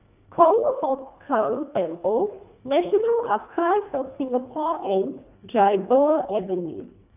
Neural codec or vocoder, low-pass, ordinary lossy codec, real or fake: codec, 24 kHz, 1.5 kbps, HILCodec; 3.6 kHz; none; fake